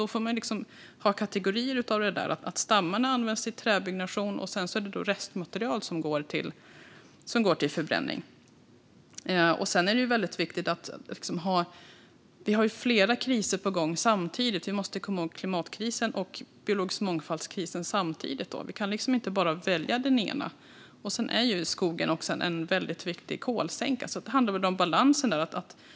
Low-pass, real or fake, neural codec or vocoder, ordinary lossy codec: none; real; none; none